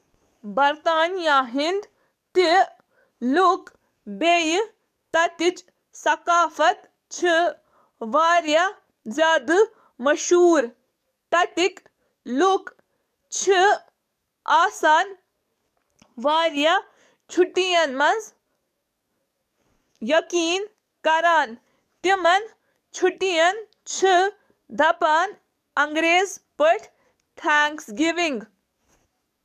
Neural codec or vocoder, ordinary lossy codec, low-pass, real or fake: codec, 44.1 kHz, 7.8 kbps, DAC; none; 14.4 kHz; fake